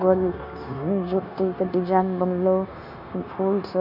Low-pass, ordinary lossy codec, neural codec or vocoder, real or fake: 5.4 kHz; none; codec, 16 kHz, 0.9 kbps, LongCat-Audio-Codec; fake